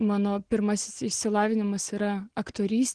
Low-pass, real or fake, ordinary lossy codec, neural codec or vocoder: 9.9 kHz; real; Opus, 16 kbps; none